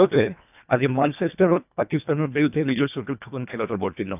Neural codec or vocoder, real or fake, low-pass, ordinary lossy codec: codec, 24 kHz, 1.5 kbps, HILCodec; fake; 3.6 kHz; none